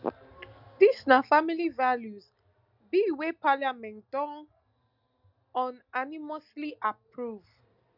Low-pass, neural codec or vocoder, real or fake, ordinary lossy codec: 5.4 kHz; none; real; none